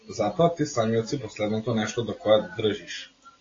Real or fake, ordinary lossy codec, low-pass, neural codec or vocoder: real; AAC, 32 kbps; 7.2 kHz; none